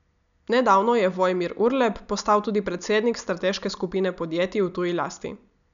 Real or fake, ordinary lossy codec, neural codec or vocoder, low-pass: real; none; none; 7.2 kHz